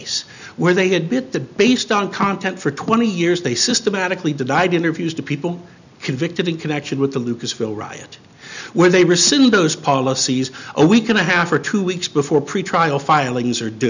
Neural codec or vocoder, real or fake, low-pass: none; real; 7.2 kHz